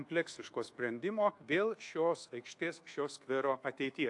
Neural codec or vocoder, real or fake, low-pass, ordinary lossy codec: codec, 24 kHz, 1.2 kbps, DualCodec; fake; 10.8 kHz; AAC, 48 kbps